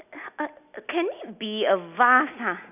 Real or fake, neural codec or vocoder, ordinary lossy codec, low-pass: real; none; none; 3.6 kHz